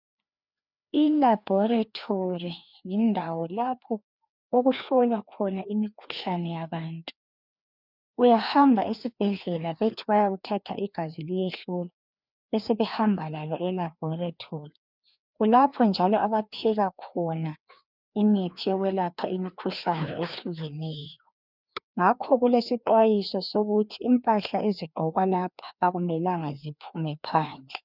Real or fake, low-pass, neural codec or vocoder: fake; 5.4 kHz; codec, 16 kHz, 2 kbps, FreqCodec, larger model